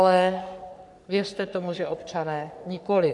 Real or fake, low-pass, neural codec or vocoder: fake; 10.8 kHz; codec, 44.1 kHz, 3.4 kbps, Pupu-Codec